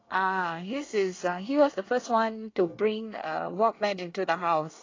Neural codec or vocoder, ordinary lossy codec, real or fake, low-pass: codec, 24 kHz, 1 kbps, SNAC; AAC, 32 kbps; fake; 7.2 kHz